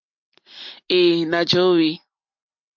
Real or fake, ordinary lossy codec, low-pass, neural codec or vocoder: real; MP3, 48 kbps; 7.2 kHz; none